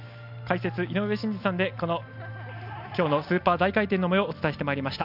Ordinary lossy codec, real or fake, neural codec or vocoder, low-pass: none; real; none; 5.4 kHz